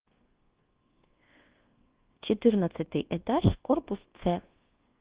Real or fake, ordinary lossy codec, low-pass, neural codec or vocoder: fake; Opus, 16 kbps; 3.6 kHz; codec, 24 kHz, 1.2 kbps, DualCodec